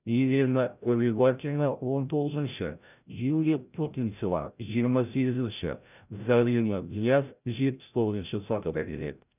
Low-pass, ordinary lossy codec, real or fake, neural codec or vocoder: 3.6 kHz; none; fake; codec, 16 kHz, 0.5 kbps, FreqCodec, larger model